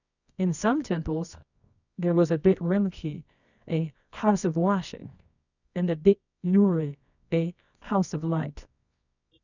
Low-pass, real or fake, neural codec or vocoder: 7.2 kHz; fake; codec, 24 kHz, 0.9 kbps, WavTokenizer, medium music audio release